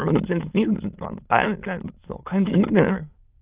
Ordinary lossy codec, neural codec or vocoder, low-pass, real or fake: Opus, 32 kbps; autoencoder, 22.05 kHz, a latent of 192 numbers a frame, VITS, trained on many speakers; 3.6 kHz; fake